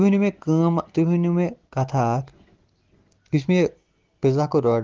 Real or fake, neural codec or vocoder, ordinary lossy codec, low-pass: real; none; Opus, 16 kbps; 7.2 kHz